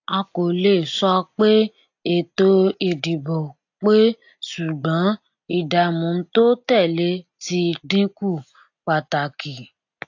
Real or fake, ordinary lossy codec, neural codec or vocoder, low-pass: real; AAC, 48 kbps; none; 7.2 kHz